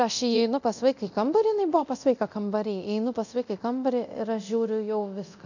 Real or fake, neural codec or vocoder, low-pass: fake; codec, 24 kHz, 0.9 kbps, DualCodec; 7.2 kHz